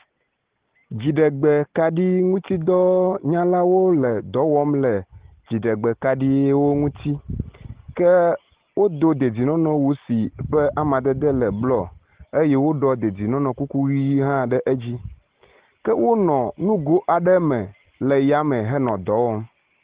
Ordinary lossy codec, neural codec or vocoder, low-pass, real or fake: Opus, 16 kbps; none; 3.6 kHz; real